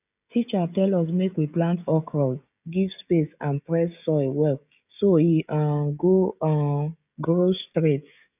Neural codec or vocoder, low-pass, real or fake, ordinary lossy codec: codec, 16 kHz, 16 kbps, FreqCodec, smaller model; 3.6 kHz; fake; none